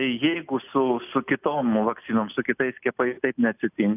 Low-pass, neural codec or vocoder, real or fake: 3.6 kHz; none; real